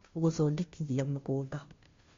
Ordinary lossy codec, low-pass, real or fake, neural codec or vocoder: MP3, 48 kbps; 7.2 kHz; fake; codec, 16 kHz, 0.5 kbps, FunCodec, trained on Chinese and English, 25 frames a second